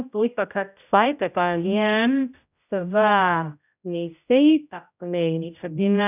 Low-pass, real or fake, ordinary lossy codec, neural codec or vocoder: 3.6 kHz; fake; none; codec, 16 kHz, 0.5 kbps, X-Codec, HuBERT features, trained on general audio